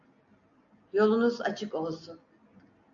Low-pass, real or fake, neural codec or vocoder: 7.2 kHz; real; none